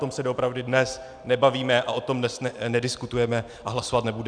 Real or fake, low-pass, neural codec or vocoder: real; 9.9 kHz; none